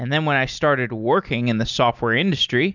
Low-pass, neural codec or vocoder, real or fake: 7.2 kHz; none; real